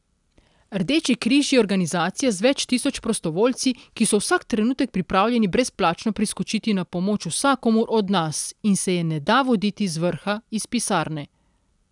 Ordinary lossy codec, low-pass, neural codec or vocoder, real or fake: none; 10.8 kHz; none; real